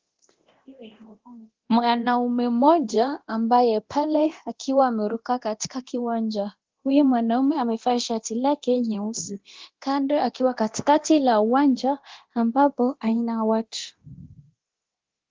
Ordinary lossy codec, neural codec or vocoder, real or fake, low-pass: Opus, 16 kbps; codec, 24 kHz, 0.9 kbps, DualCodec; fake; 7.2 kHz